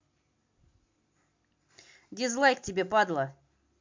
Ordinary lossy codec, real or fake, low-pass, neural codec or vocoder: MP3, 64 kbps; real; 7.2 kHz; none